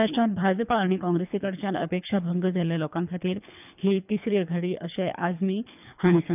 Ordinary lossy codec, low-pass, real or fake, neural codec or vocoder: none; 3.6 kHz; fake; codec, 24 kHz, 3 kbps, HILCodec